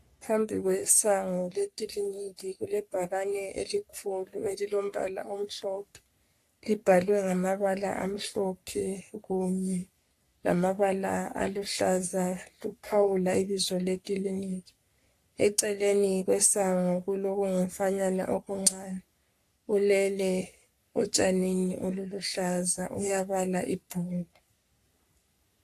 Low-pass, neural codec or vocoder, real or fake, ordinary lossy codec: 14.4 kHz; codec, 44.1 kHz, 3.4 kbps, Pupu-Codec; fake; AAC, 64 kbps